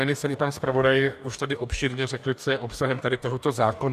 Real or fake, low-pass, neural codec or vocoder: fake; 14.4 kHz; codec, 44.1 kHz, 2.6 kbps, DAC